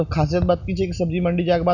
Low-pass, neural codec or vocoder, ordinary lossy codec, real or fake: 7.2 kHz; none; none; real